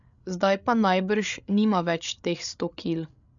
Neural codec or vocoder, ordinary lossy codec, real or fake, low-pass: codec, 16 kHz, 8 kbps, FreqCodec, larger model; none; fake; 7.2 kHz